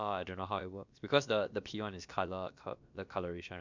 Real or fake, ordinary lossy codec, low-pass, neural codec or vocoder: fake; MP3, 64 kbps; 7.2 kHz; codec, 16 kHz, about 1 kbps, DyCAST, with the encoder's durations